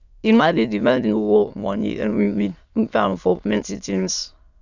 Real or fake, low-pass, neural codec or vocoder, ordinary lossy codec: fake; 7.2 kHz; autoencoder, 22.05 kHz, a latent of 192 numbers a frame, VITS, trained on many speakers; none